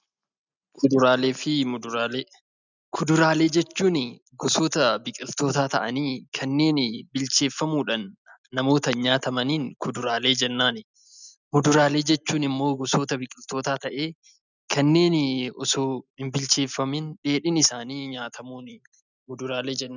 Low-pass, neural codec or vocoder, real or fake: 7.2 kHz; none; real